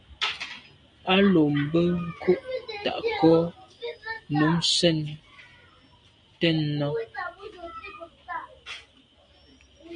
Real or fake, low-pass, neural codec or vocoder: real; 9.9 kHz; none